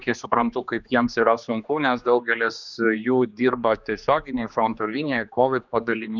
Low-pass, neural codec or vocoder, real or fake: 7.2 kHz; codec, 16 kHz, 2 kbps, X-Codec, HuBERT features, trained on general audio; fake